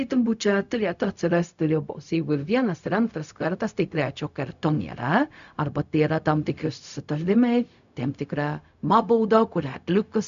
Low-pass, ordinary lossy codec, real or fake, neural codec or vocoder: 7.2 kHz; MP3, 96 kbps; fake; codec, 16 kHz, 0.4 kbps, LongCat-Audio-Codec